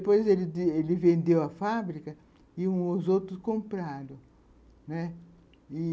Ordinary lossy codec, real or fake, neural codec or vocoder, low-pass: none; real; none; none